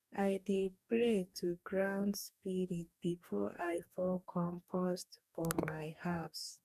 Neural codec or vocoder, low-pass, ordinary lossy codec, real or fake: codec, 44.1 kHz, 2.6 kbps, DAC; 14.4 kHz; none; fake